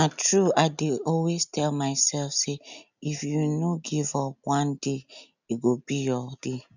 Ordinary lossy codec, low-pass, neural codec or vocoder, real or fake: none; 7.2 kHz; none; real